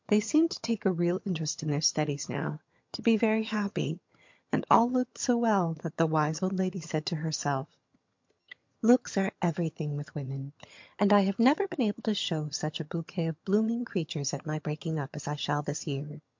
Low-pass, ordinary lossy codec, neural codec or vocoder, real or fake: 7.2 kHz; MP3, 48 kbps; vocoder, 22.05 kHz, 80 mel bands, HiFi-GAN; fake